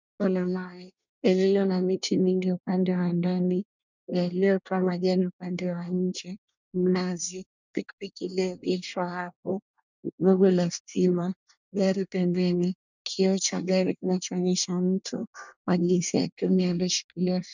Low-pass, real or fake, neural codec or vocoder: 7.2 kHz; fake; codec, 24 kHz, 1 kbps, SNAC